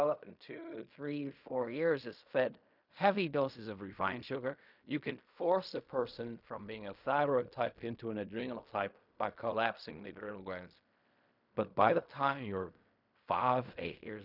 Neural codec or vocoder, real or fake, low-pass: codec, 16 kHz in and 24 kHz out, 0.4 kbps, LongCat-Audio-Codec, fine tuned four codebook decoder; fake; 5.4 kHz